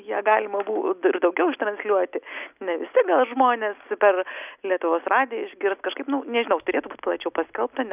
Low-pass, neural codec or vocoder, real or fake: 3.6 kHz; none; real